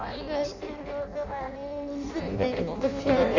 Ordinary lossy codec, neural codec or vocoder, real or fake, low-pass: none; codec, 16 kHz in and 24 kHz out, 0.6 kbps, FireRedTTS-2 codec; fake; 7.2 kHz